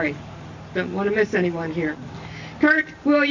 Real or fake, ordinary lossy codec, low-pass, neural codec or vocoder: real; AAC, 48 kbps; 7.2 kHz; none